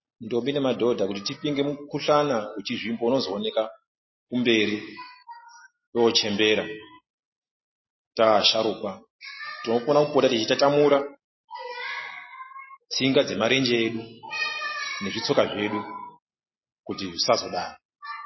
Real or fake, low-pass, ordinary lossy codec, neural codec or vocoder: real; 7.2 kHz; MP3, 24 kbps; none